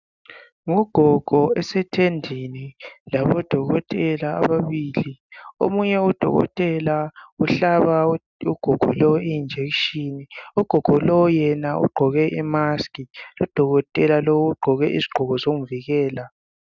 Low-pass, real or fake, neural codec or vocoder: 7.2 kHz; real; none